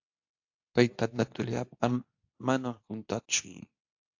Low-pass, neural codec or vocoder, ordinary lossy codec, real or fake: 7.2 kHz; codec, 24 kHz, 0.9 kbps, WavTokenizer, medium speech release version 2; AAC, 48 kbps; fake